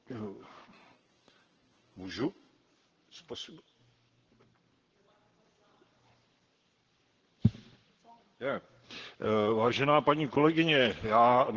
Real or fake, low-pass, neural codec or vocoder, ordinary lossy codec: fake; 7.2 kHz; codec, 44.1 kHz, 7.8 kbps, Pupu-Codec; Opus, 16 kbps